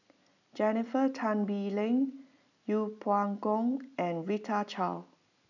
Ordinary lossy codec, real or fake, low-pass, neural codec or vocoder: none; real; 7.2 kHz; none